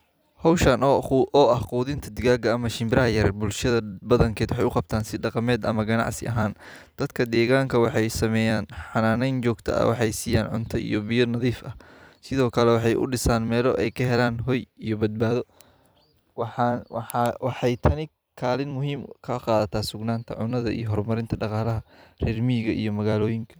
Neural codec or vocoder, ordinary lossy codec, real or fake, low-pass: vocoder, 44.1 kHz, 128 mel bands every 256 samples, BigVGAN v2; none; fake; none